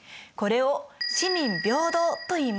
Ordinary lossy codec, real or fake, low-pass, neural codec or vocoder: none; real; none; none